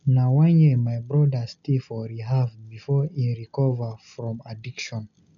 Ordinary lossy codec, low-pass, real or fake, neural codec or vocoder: none; 7.2 kHz; real; none